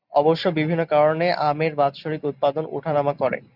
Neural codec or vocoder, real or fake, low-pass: none; real; 5.4 kHz